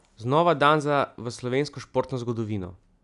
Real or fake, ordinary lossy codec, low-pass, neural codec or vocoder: real; none; 10.8 kHz; none